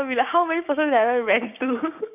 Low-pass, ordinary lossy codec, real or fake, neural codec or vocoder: 3.6 kHz; none; fake; codec, 16 kHz, 6 kbps, DAC